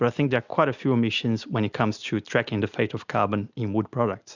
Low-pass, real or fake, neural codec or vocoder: 7.2 kHz; real; none